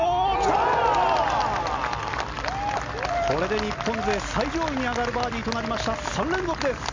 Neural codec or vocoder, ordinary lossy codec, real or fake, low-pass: none; MP3, 48 kbps; real; 7.2 kHz